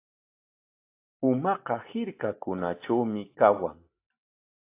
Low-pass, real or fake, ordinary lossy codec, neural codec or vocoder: 3.6 kHz; real; AAC, 24 kbps; none